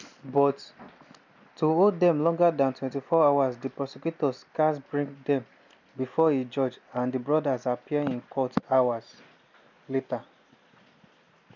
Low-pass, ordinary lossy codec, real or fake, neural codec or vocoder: 7.2 kHz; none; real; none